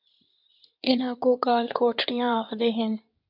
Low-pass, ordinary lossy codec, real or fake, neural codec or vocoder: 5.4 kHz; MP3, 48 kbps; fake; codec, 16 kHz in and 24 kHz out, 2.2 kbps, FireRedTTS-2 codec